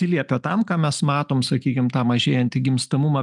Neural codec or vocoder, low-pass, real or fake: none; 10.8 kHz; real